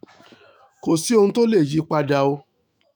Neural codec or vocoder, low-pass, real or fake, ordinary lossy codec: autoencoder, 48 kHz, 128 numbers a frame, DAC-VAE, trained on Japanese speech; none; fake; none